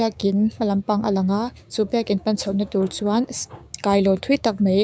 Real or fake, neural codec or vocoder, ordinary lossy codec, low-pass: fake; codec, 16 kHz, 6 kbps, DAC; none; none